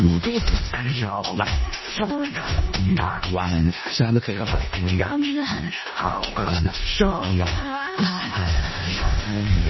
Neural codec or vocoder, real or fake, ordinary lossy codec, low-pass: codec, 16 kHz in and 24 kHz out, 0.4 kbps, LongCat-Audio-Codec, four codebook decoder; fake; MP3, 24 kbps; 7.2 kHz